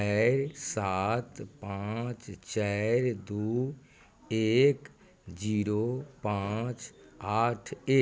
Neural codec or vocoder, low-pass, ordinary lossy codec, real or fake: none; none; none; real